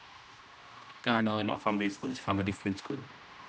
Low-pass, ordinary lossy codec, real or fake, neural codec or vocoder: none; none; fake; codec, 16 kHz, 1 kbps, X-Codec, HuBERT features, trained on general audio